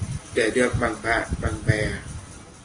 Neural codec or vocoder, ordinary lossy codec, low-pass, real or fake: none; AAC, 32 kbps; 9.9 kHz; real